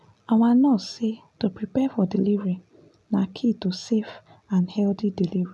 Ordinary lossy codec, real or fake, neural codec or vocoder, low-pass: none; real; none; none